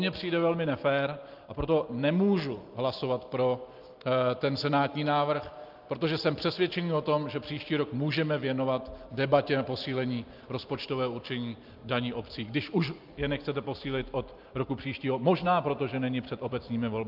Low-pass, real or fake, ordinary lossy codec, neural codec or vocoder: 5.4 kHz; real; Opus, 24 kbps; none